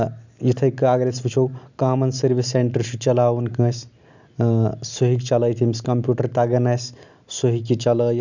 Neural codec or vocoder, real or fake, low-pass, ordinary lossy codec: none; real; 7.2 kHz; none